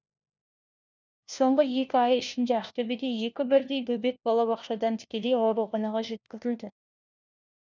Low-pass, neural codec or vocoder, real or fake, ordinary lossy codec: none; codec, 16 kHz, 1 kbps, FunCodec, trained on LibriTTS, 50 frames a second; fake; none